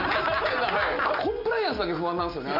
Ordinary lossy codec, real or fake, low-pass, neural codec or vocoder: none; real; 5.4 kHz; none